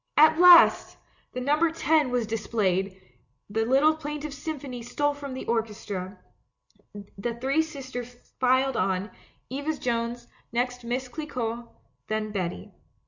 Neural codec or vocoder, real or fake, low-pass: none; real; 7.2 kHz